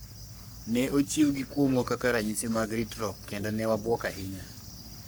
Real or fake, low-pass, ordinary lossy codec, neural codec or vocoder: fake; none; none; codec, 44.1 kHz, 3.4 kbps, Pupu-Codec